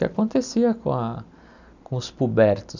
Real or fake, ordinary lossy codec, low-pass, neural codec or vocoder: real; none; 7.2 kHz; none